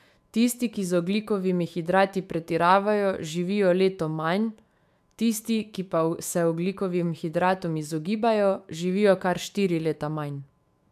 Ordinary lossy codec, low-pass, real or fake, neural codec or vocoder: AAC, 96 kbps; 14.4 kHz; fake; autoencoder, 48 kHz, 128 numbers a frame, DAC-VAE, trained on Japanese speech